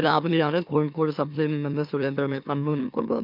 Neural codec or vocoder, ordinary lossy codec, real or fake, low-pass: autoencoder, 44.1 kHz, a latent of 192 numbers a frame, MeloTTS; none; fake; 5.4 kHz